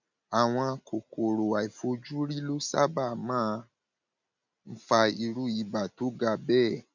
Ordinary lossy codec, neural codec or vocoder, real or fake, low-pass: none; none; real; 7.2 kHz